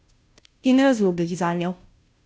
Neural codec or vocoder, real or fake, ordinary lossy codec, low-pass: codec, 16 kHz, 0.5 kbps, FunCodec, trained on Chinese and English, 25 frames a second; fake; none; none